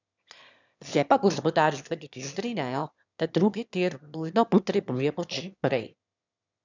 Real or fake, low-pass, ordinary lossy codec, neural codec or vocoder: fake; 7.2 kHz; none; autoencoder, 22.05 kHz, a latent of 192 numbers a frame, VITS, trained on one speaker